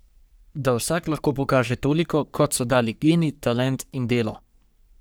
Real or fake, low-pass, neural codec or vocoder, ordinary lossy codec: fake; none; codec, 44.1 kHz, 3.4 kbps, Pupu-Codec; none